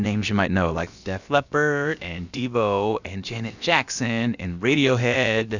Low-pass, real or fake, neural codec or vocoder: 7.2 kHz; fake; codec, 16 kHz, about 1 kbps, DyCAST, with the encoder's durations